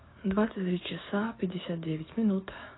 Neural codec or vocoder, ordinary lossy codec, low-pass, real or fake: codec, 16 kHz, 6 kbps, DAC; AAC, 16 kbps; 7.2 kHz; fake